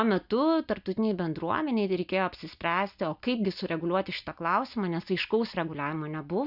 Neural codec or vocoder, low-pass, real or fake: none; 5.4 kHz; real